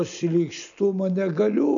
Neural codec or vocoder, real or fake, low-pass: none; real; 7.2 kHz